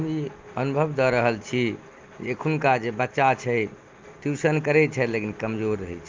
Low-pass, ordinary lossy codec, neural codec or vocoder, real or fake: 7.2 kHz; Opus, 32 kbps; none; real